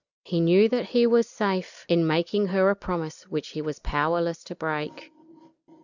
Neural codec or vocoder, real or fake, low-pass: none; real; 7.2 kHz